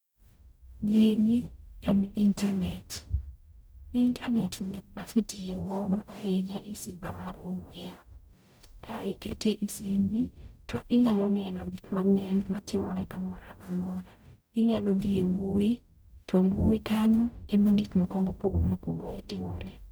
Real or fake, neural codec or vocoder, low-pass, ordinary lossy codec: fake; codec, 44.1 kHz, 0.9 kbps, DAC; none; none